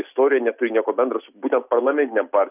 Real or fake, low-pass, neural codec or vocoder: real; 3.6 kHz; none